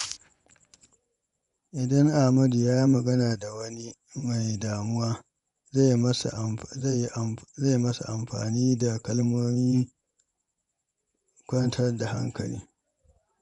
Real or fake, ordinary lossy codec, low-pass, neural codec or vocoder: fake; none; 10.8 kHz; vocoder, 24 kHz, 100 mel bands, Vocos